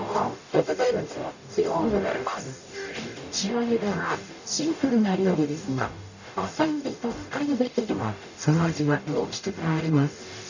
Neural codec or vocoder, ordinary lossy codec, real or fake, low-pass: codec, 44.1 kHz, 0.9 kbps, DAC; none; fake; 7.2 kHz